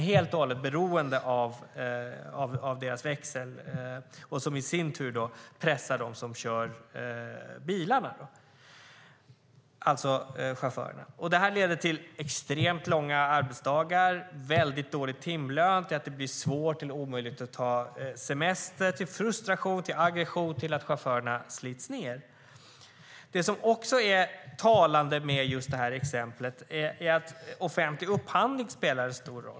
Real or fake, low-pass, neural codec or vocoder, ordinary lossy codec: real; none; none; none